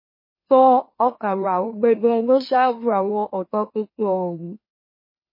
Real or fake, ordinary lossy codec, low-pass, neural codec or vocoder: fake; MP3, 24 kbps; 5.4 kHz; autoencoder, 44.1 kHz, a latent of 192 numbers a frame, MeloTTS